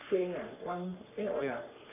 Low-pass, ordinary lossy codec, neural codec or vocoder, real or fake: 3.6 kHz; none; codec, 44.1 kHz, 3.4 kbps, Pupu-Codec; fake